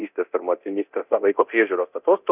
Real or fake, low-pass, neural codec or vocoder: fake; 3.6 kHz; codec, 24 kHz, 0.9 kbps, DualCodec